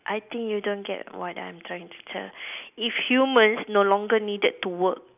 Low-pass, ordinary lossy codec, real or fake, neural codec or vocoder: 3.6 kHz; none; real; none